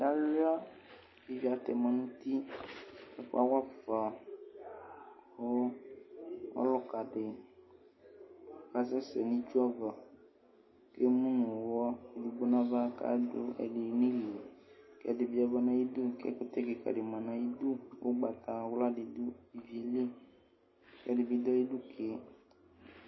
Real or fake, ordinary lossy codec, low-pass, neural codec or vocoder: real; MP3, 24 kbps; 7.2 kHz; none